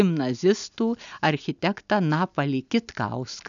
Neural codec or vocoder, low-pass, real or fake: none; 7.2 kHz; real